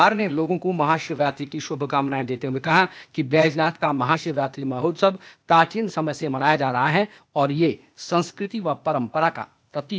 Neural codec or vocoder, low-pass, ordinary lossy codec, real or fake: codec, 16 kHz, 0.8 kbps, ZipCodec; none; none; fake